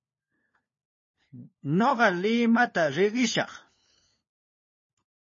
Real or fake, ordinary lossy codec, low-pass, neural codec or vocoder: fake; MP3, 32 kbps; 7.2 kHz; codec, 16 kHz, 4 kbps, FunCodec, trained on LibriTTS, 50 frames a second